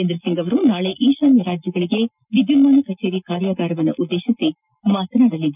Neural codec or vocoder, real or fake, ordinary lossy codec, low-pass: none; real; none; 3.6 kHz